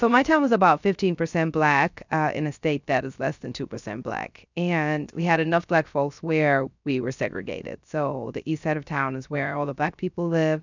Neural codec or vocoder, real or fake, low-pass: codec, 16 kHz, 0.3 kbps, FocalCodec; fake; 7.2 kHz